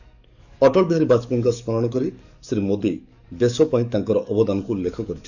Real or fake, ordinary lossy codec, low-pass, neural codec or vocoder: fake; none; 7.2 kHz; codec, 44.1 kHz, 7.8 kbps, DAC